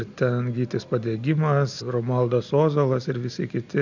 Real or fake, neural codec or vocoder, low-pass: real; none; 7.2 kHz